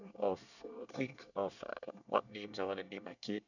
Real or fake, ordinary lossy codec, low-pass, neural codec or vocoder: fake; none; 7.2 kHz; codec, 24 kHz, 1 kbps, SNAC